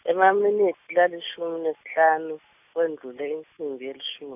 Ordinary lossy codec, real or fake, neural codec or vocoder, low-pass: none; real; none; 3.6 kHz